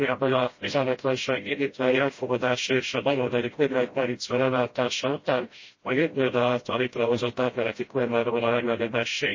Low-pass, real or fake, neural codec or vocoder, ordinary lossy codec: 7.2 kHz; fake; codec, 16 kHz, 0.5 kbps, FreqCodec, smaller model; MP3, 32 kbps